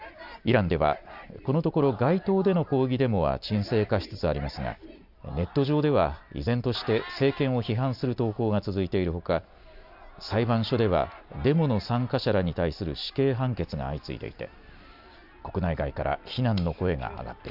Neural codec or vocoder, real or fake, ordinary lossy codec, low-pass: none; real; none; 5.4 kHz